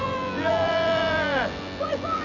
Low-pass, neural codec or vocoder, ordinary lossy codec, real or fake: 7.2 kHz; vocoder, 24 kHz, 100 mel bands, Vocos; none; fake